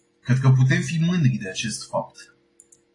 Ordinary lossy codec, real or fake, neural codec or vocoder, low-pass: AAC, 32 kbps; real; none; 10.8 kHz